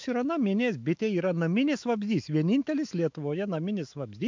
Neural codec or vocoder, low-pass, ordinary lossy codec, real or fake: autoencoder, 48 kHz, 128 numbers a frame, DAC-VAE, trained on Japanese speech; 7.2 kHz; MP3, 64 kbps; fake